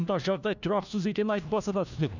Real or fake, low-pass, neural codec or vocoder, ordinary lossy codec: fake; 7.2 kHz; codec, 16 kHz, 1 kbps, FunCodec, trained on LibriTTS, 50 frames a second; none